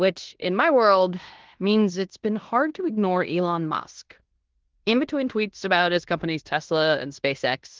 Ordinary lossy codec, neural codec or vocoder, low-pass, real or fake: Opus, 16 kbps; codec, 16 kHz in and 24 kHz out, 0.9 kbps, LongCat-Audio-Codec, fine tuned four codebook decoder; 7.2 kHz; fake